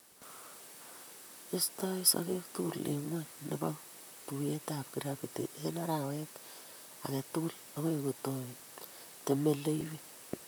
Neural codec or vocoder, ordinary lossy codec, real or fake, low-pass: vocoder, 44.1 kHz, 128 mel bands, Pupu-Vocoder; none; fake; none